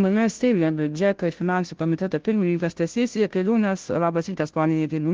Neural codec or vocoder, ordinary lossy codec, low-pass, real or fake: codec, 16 kHz, 0.5 kbps, FunCodec, trained on Chinese and English, 25 frames a second; Opus, 16 kbps; 7.2 kHz; fake